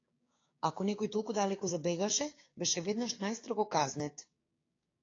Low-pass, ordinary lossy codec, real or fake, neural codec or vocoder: 7.2 kHz; AAC, 32 kbps; fake; codec, 16 kHz, 6 kbps, DAC